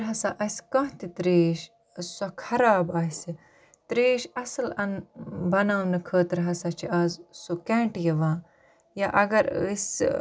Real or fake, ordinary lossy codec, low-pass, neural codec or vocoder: real; none; none; none